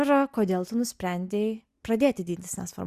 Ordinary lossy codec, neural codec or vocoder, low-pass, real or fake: Opus, 64 kbps; none; 14.4 kHz; real